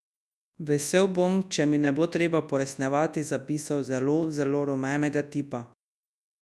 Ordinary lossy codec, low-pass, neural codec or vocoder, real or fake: none; none; codec, 24 kHz, 0.9 kbps, WavTokenizer, large speech release; fake